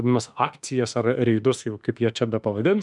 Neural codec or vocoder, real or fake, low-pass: autoencoder, 48 kHz, 32 numbers a frame, DAC-VAE, trained on Japanese speech; fake; 10.8 kHz